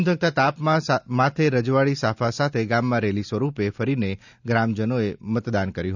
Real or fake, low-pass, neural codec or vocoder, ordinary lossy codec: real; 7.2 kHz; none; none